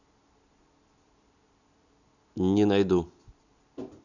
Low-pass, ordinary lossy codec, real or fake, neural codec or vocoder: 7.2 kHz; none; real; none